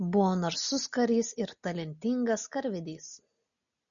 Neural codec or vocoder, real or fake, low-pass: none; real; 7.2 kHz